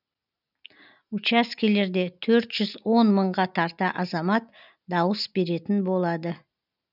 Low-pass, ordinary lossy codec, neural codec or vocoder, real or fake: 5.4 kHz; none; none; real